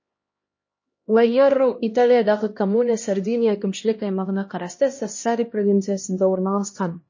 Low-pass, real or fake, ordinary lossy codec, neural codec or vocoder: 7.2 kHz; fake; MP3, 32 kbps; codec, 16 kHz, 1 kbps, X-Codec, HuBERT features, trained on LibriSpeech